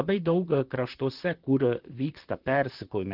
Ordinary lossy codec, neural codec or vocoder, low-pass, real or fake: Opus, 16 kbps; vocoder, 44.1 kHz, 128 mel bands, Pupu-Vocoder; 5.4 kHz; fake